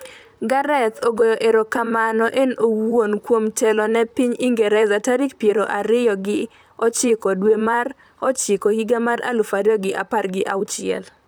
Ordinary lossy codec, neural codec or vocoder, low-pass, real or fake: none; vocoder, 44.1 kHz, 128 mel bands, Pupu-Vocoder; none; fake